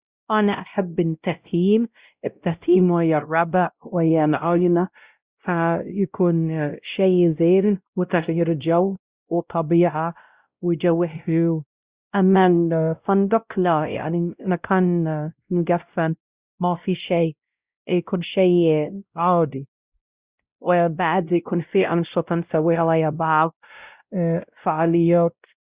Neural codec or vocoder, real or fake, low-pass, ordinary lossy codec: codec, 16 kHz, 0.5 kbps, X-Codec, WavLM features, trained on Multilingual LibriSpeech; fake; 3.6 kHz; Opus, 64 kbps